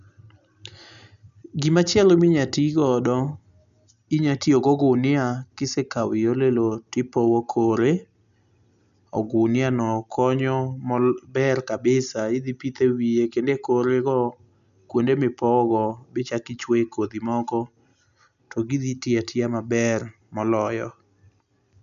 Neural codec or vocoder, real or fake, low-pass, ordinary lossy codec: none; real; 7.2 kHz; none